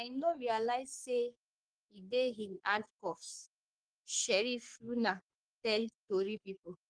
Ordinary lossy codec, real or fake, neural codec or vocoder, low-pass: Opus, 24 kbps; fake; codec, 44.1 kHz, 3.4 kbps, Pupu-Codec; 9.9 kHz